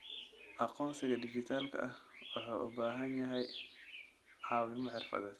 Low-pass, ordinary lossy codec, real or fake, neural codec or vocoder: 19.8 kHz; Opus, 24 kbps; real; none